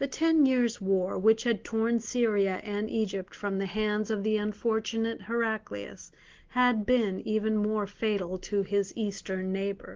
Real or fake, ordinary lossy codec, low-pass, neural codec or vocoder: real; Opus, 16 kbps; 7.2 kHz; none